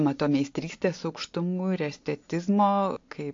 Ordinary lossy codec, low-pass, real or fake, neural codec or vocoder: AAC, 48 kbps; 7.2 kHz; real; none